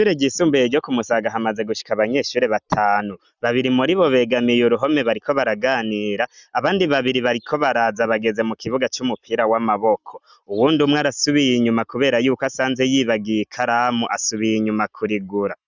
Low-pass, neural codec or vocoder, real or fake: 7.2 kHz; none; real